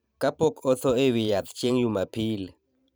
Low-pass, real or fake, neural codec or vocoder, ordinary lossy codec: none; fake; vocoder, 44.1 kHz, 128 mel bands every 512 samples, BigVGAN v2; none